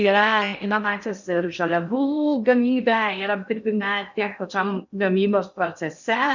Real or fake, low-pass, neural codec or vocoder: fake; 7.2 kHz; codec, 16 kHz in and 24 kHz out, 0.6 kbps, FocalCodec, streaming, 4096 codes